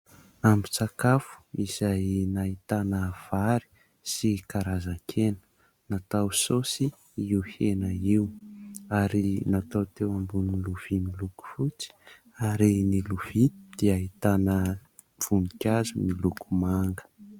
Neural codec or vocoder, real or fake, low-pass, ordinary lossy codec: none; real; 19.8 kHz; Opus, 64 kbps